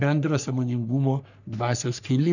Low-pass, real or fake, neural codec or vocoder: 7.2 kHz; fake; codec, 44.1 kHz, 3.4 kbps, Pupu-Codec